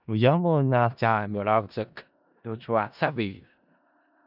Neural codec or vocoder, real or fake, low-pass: codec, 16 kHz in and 24 kHz out, 0.4 kbps, LongCat-Audio-Codec, four codebook decoder; fake; 5.4 kHz